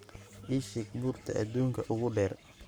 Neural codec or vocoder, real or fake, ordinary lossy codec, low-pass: codec, 44.1 kHz, 7.8 kbps, Pupu-Codec; fake; none; none